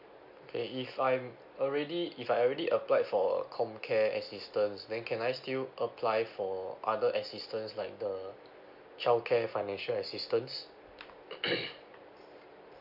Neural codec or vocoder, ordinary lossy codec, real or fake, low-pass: none; AAC, 48 kbps; real; 5.4 kHz